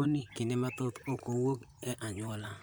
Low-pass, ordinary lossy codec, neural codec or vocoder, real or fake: none; none; vocoder, 44.1 kHz, 128 mel bands, Pupu-Vocoder; fake